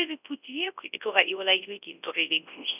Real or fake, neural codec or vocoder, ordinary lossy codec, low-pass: fake; codec, 24 kHz, 0.9 kbps, WavTokenizer, large speech release; none; 3.6 kHz